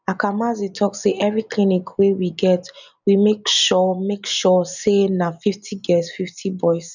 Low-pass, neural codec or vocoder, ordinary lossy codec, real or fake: 7.2 kHz; none; none; real